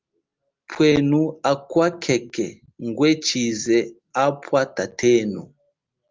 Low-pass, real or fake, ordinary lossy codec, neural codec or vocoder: 7.2 kHz; real; Opus, 24 kbps; none